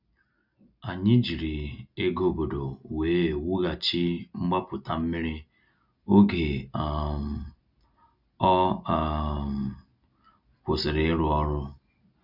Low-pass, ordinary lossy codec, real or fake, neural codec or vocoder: 5.4 kHz; none; real; none